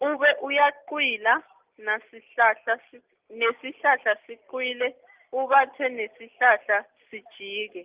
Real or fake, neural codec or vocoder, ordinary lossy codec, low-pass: real; none; Opus, 32 kbps; 3.6 kHz